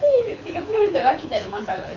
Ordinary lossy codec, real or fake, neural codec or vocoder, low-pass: none; fake; codec, 24 kHz, 6 kbps, HILCodec; 7.2 kHz